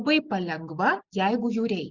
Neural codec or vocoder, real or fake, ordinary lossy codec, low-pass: none; real; Opus, 64 kbps; 7.2 kHz